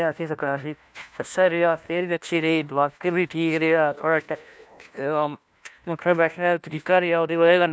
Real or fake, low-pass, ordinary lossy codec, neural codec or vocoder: fake; none; none; codec, 16 kHz, 1 kbps, FunCodec, trained on LibriTTS, 50 frames a second